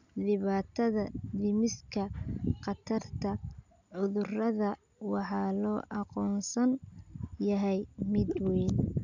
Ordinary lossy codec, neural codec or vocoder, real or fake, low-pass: none; none; real; 7.2 kHz